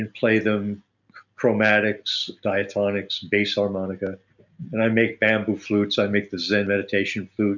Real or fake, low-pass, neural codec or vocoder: real; 7.2 kHz; none